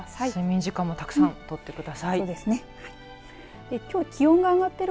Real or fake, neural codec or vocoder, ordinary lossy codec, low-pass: real; none; none; none